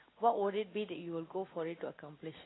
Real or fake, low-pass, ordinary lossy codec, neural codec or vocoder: real; 7.2 kHz; AAC, 16 kbps; none